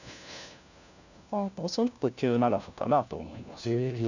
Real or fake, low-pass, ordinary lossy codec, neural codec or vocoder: fake; 7.2 kHz; none; codec, 16 kHz, 1 kbps, FunCodec, trained on LibriTTS, 50 frames a second